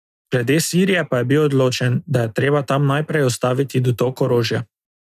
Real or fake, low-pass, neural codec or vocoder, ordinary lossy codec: real; 14.4 kHz; none; none